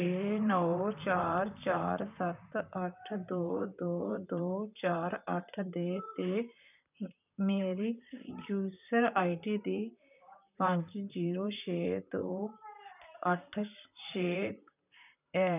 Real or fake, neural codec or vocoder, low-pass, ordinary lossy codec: fake; vocoder, 44.1 kHz, 128 mel bands, Pupu-Vocoder; 3.6 kHz; none